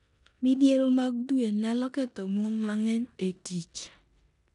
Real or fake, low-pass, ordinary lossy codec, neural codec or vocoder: fake; 10.8 kHz; none; codec, 16 kHz in and 24 kHz out, 0.9 kbps, LongCat-Audio-Codec, four codebook decoder